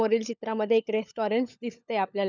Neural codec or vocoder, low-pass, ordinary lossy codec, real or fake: codec, 16 kHz, 16 kbps, FunCodec, trained on LibriTTS, 50 frames a second; 7.2 kHz; none; fake